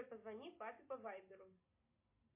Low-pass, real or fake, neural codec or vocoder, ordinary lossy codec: 3.6 kHz; real; none; MP3, 24 kbps